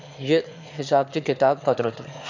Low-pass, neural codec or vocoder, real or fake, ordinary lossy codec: 7.2 kHz; autoencoder, 22.05 kHz, a latent of 192 numbers a frame, VITS, trained on one speaker; fake; none